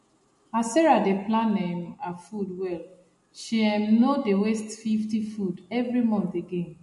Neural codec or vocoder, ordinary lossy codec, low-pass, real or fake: none; MP3, 48 kbps; 14.4 kHz; real